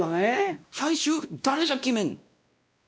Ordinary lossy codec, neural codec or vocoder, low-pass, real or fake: none; codec, 16 kHz, 1 kbps, X-Codec, WavLM features, trained on Multilingual LibriSpeech; none; fake